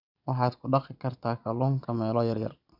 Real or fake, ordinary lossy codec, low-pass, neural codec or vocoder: fake; none; 5.4 kHz; vocoder, 22.05 kHz, 80 mel bands, Vocos